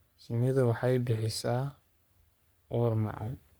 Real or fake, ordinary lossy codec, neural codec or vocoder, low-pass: fake; none; codec, 44.1 kHz, 3.4 kbps, Pupu-Codec; none